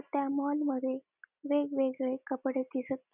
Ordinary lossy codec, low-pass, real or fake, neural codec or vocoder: none; 3.6 kHz; real; none